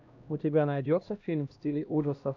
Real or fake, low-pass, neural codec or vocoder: fake; 7.2 kHz; codec, 16 kHz, 1 kbps, X-Codec, HuBERT features, trained on LibriSpeech